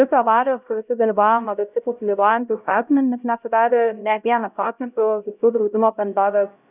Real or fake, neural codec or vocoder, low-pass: fake; codec, 16 kHz, 0.5 kbps, X-Codec, HuBERT features, trained on LibriSpeech; 3.6 kHz